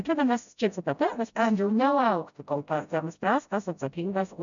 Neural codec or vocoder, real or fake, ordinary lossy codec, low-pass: codec, 16 kHz, 0.5 kbps, FreqCodec, smaller model; fake; MP3, 96 kbps; 7.2 kHz